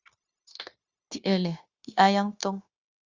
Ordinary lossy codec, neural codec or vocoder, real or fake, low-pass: Opus, 64 kbps; codec, 16 kHz, 0.9 kbps, LongCat-Audio-Codec; fake; 7.2 kHz